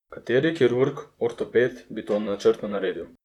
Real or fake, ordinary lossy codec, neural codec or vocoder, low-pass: fake; none; vocoder, 44.1 kHz, 128 mel bands, Pupu-Vocoder; 19.8 kHz